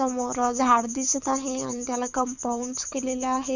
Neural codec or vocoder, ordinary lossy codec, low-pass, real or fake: codec, 24 kHz, 6 kbps, HILCodec; none; 7.2 kHz; fake